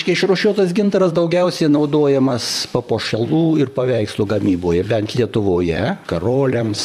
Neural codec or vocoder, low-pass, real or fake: vocoder, 44.1 kHz, 128 mel bands, Pupu-Vocoder; 14.4 kHz; fake